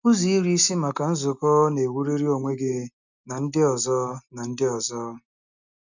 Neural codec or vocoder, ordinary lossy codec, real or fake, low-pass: none; none; real; 7.2 kHz